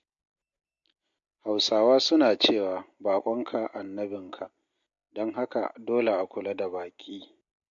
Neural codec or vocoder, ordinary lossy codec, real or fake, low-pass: none; MP3, 48 kbps; real; 7.2 kHz